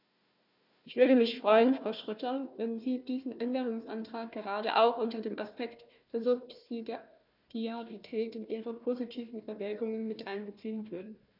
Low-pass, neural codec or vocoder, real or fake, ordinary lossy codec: 5.4 kHz; codec, 16 kHz, 1 kbps, FunCodec, trained on Chinese and English, 50 frames a second; fake; none